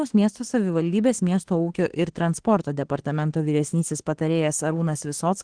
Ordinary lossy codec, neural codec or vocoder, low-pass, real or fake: Opus, 16 kbps; autoencoder, 48 kHz, 32 numbers a frame, DAC-VAE, trained on Japanese speech; 9.9 kHz; fake